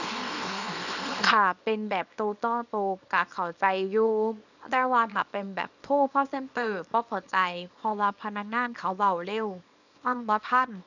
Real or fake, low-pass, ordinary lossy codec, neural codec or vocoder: fake; 7.2 kHz; none; codec, 24 kHz, 0.9 kbps, WavTokenizer, small release